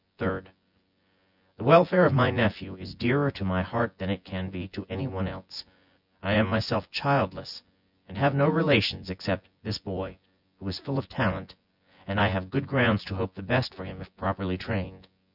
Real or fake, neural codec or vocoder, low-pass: fake; vocoder, 24 kHz, 100 mel bands, Vocos; 5.4 kHz